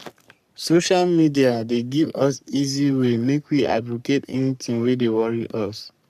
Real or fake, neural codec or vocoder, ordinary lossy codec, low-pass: fake; codec, 44.1 kHz, 3.4 kbps, Pupu-Codec; none; 14.4 kHz